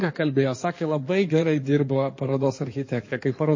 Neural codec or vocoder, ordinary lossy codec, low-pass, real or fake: codec, 16 kHz in and 24 kHz out, 2.2 kbps, FireRedTTS-2 codec; MP3, 32 kbps; 7.2 kHz; fake